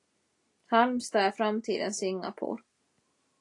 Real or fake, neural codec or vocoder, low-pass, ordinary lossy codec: real; none; 10.8 kHz; AAC, 48 kbps